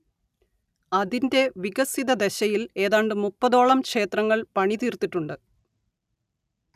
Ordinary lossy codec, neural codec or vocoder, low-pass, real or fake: none; none; 14.4 kHz; real